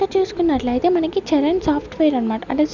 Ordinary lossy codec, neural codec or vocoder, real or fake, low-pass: none; none; real; 7.2 kHz